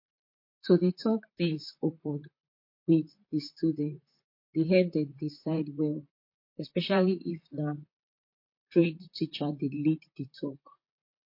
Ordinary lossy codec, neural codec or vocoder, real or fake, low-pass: MP3, 32 kbps; vocoder, 44.1 kHz, 128 mel bands, Pupu-Vocoder; fake; 5.4 kHz